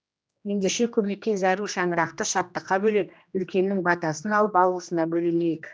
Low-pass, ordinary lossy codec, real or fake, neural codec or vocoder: none; none; fake; codec, 16 kHz, 1 kbps, X-Codec, HuBERT features, trained on general audio